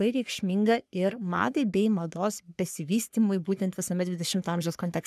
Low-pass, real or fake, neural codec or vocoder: 14.4 kHz; fake; codec, 44.1 kHz, 3.4 kbps, Pupu-Codec